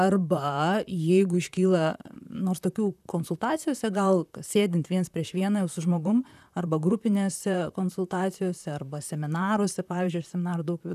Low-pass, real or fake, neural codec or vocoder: 14.4 kHz; fake; vocoder, 44.1 kHz, 128 mel bands, Pupu-Vocoder